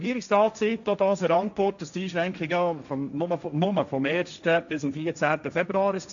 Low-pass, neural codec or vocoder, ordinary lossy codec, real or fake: 7.2 kHz; codec, 16 kHz, 1.1 kbps, Voila-Tokenizer; none; fake